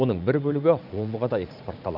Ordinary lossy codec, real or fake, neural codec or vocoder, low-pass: none; fake; vocoder, 44.1 kHz, 80 mel bands, Vocos; 5.4 kHz